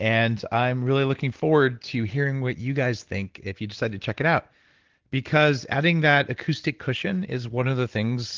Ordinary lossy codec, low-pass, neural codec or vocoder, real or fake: Opus, 16 kbps; 7.2 kHz; none; real